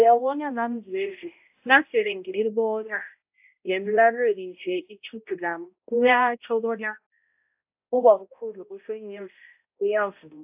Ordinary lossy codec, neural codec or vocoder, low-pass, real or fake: AAC, 32 kbps; codec, 16 kHz, 0.5 kbps, X-Codec, HuBERT features, trained on balanced general audio; 3.6 kHz; fake